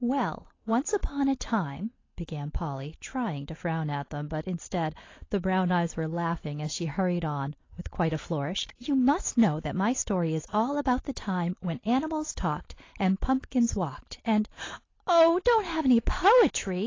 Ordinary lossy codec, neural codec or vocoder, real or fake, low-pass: AAC, 32 kbps; none; real; 7.2 kHz